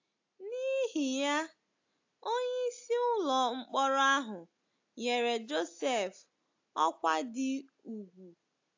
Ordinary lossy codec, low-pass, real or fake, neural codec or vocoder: AAC, 48 kbps; 7.2 kHz; real; none